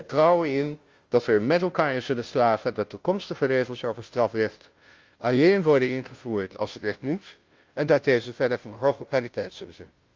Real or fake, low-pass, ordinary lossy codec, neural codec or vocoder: fake; 7.2 kHz; Opus, 32 kbps; codec, 16 kHz, 0.5 kbps, FunCodec, trained on Chinese and English, 25 frames a second